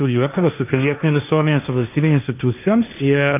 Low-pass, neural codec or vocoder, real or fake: 3.6 kHz; codec, 16 kHz, 1.1 kbps, Voila-Tokenizer; fake